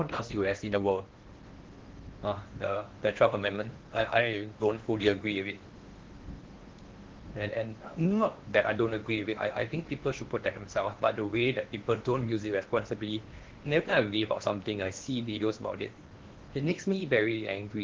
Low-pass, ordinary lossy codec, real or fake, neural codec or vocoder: 7.2 kHz; Opus, 16 kbps; fake; codec, 16 kHz in and 24 kHz out, 0.8 kbps, FocalCodec, streaming, 65536 codes